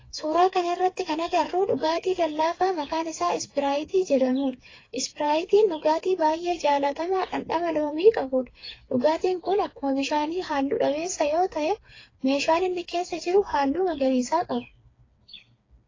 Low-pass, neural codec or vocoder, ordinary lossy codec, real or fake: 7.2 kHz; codec, 16 kHz, 4 kbps, FreqCodec, smaller model; AAC, 32 kbps; fake